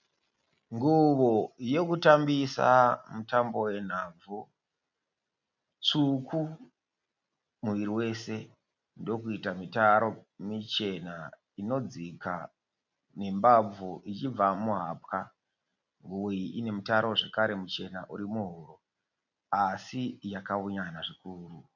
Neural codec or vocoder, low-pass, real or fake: none; 7.2 kHz; real